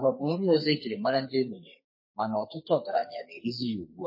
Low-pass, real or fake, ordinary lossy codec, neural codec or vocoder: 5.4 kHz; fake; MP3, 24 kbps; codec, 32 kHz, 1.9 kbps, SNAC